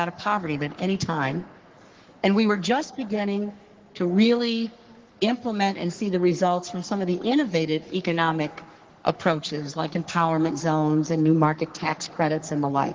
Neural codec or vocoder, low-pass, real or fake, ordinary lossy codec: codec, 44.1 kHz, 3.4 kbps, Pupu-Codec; 7.2 kHz; fake; Opus, 16 kbps